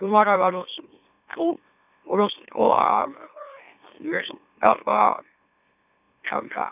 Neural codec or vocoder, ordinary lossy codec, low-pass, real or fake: autoencoder, 44.1 kHz, a latent of 192 numbers a frame, MeloTTS; none; 3.6 kHz; fake